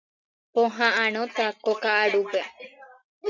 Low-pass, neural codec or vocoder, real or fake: 7.2 kHz; none; real